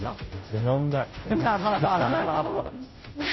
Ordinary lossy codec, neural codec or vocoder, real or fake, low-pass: MP3, 24 kbps; codec, 16 kHz, 0.5 kbps, FunCodec, trained on Chinese and English, 25 frames a second; fake; 7.2 kHz